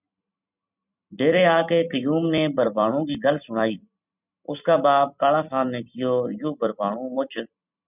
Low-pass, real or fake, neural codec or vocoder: 3.6 kHz; fake; vocoder, 44.1 kHz, 128 mel bands every 256 samples, BigVGAN v2